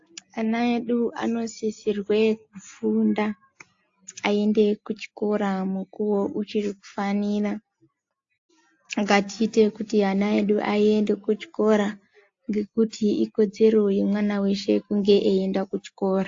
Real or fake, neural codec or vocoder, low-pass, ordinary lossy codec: real; none; 7.2 kHz; AAC, 48 kbps